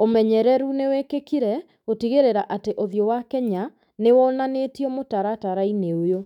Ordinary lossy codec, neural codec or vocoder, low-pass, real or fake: none; autoencoder, 48 kHz, 128 numbers a frame, DAC-VAE, trained on Japanese speech; 19.8 kHz; fake